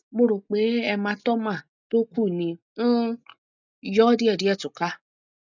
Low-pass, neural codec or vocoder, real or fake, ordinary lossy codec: 7.2 kHz; none; real; none